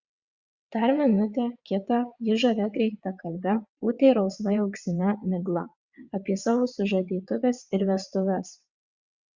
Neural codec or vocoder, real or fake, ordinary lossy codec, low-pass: vocoder, 22.05 kHz, 80 mel bands, WaveNeXt; fake; Opus, 64 kbps; 7.2 kHz